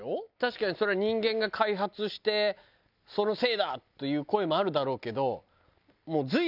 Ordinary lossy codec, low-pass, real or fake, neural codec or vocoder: none; 5.4 kHz; real; none